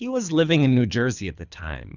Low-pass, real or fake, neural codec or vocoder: 7.2 kHz; fake; codec, 24 kHz, 3 kbps, HILCodec